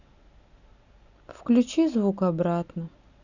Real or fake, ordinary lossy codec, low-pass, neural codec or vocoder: real; none; 7.2 kHz; none